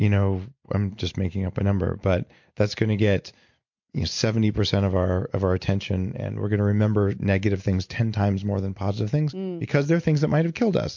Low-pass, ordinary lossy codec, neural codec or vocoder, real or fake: 7.2 kHz; MP3, 48 kbps; none; real